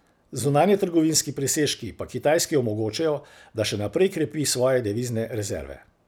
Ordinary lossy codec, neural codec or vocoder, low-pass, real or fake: none; none; none; real